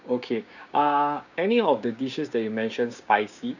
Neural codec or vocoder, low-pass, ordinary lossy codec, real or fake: codec, 16 kHz, 6 kbps, DAC; 7.2 kHz; none; fake